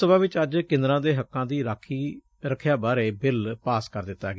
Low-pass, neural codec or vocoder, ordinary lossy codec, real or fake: none; none; none; real